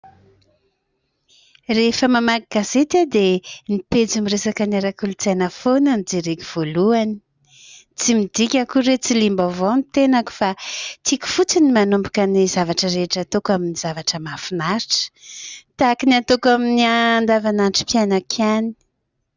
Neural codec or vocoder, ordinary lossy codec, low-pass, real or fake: none; Opus, 64 kbps; 7.2 kHz; real